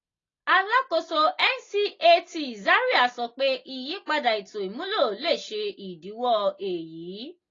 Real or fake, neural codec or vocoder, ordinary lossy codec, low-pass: real; none; AAC, 32 kbps; 7.2 kHz